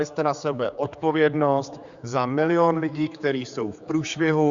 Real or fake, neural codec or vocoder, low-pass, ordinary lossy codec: fake; codec, 16 kHz, 4 kbps, X-Codec, HuBERT features, trained on general audio; 7.2 kHz; Opus, 64 kbps